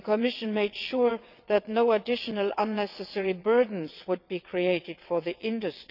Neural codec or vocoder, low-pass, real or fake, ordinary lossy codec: vocoder, 22.05 kHz, 80 mel bands, WaveNeXt; 5.4 kHz; fake; none